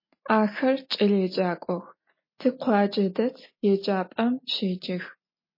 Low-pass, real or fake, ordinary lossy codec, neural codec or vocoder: 5.4 kHz; real; MP3, 24 kbps; none